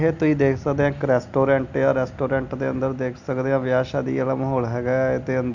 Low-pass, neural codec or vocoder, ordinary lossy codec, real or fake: 7.2 kHz; none; none; real